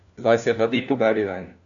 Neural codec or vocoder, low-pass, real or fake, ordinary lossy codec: codec, 16 kHz, 1 kbps, FunCodec, trained on LibriTTS, 50 frames a second; 7.2 kHz; fake; none